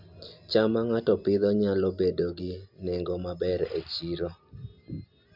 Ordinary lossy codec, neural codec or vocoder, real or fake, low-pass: MP3, 48 kbps; none; real; 5.4 kHz